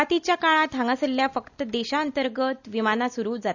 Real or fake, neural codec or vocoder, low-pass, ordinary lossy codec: real; none; 7.2 kHz; none